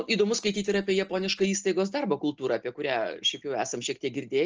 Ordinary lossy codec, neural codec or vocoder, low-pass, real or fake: Opus, 24 kbps; none; 7.2 kHz; real